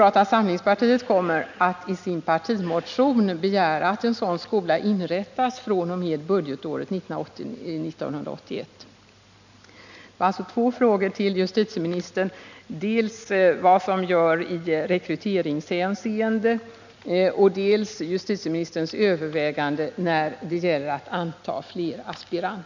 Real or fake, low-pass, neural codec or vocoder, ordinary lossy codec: real; 7.2 kHz; none; none